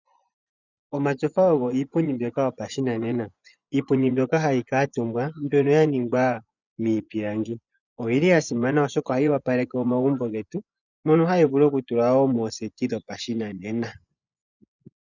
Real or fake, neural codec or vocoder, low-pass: fake; vocoder, 24 kHz, 100 mel bands, Vocos; 7.2 kHz